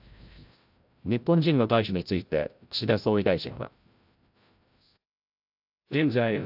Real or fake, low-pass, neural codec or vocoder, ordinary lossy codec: fake; 5.4 kHz; codec, 16 kHz, 0.5 kbps, FreqCodec, larger model; AAC, 48 kbps